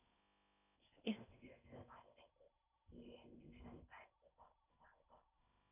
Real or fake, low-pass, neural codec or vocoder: fake; 3.6 kHz; codec, 16 kHz in and 24 kHz out, 0.6 kbps, FocalCodec, streaming, 4096 codes